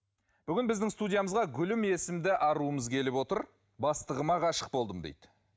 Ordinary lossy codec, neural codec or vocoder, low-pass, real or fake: none; none; none; real